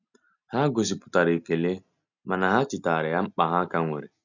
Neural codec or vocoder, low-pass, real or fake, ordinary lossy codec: none; 7.2 kHz; real; none